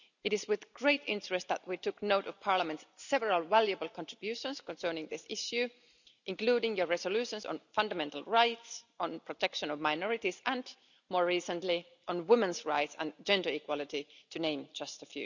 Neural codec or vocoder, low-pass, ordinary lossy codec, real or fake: none; 7.2 kHz; none; real